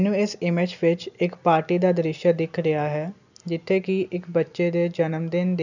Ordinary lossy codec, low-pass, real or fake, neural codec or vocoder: none; 7.2 kHz; real; none